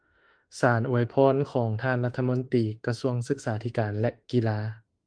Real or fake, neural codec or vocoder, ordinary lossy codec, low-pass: fake; autoencoder, 48 kHz, 32 numbers a frame, DAC-VAE, trained on Japanese speech; Opus, 24 kbps; 9.9 kHz